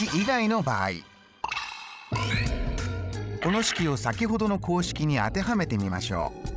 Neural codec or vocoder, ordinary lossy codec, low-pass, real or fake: codec, 16 kHz, 16 kbps, FreqCodec, larger model; none; none; fake